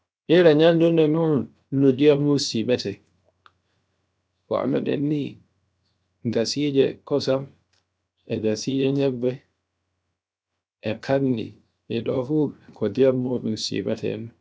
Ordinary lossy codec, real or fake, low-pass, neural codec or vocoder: none; fake; none; codec, 16 kHz, 0.7 kbps, FocalCodec